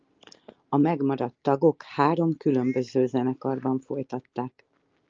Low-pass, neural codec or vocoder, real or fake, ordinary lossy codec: 7.2 kHz; none; real; Opus, 24 kbps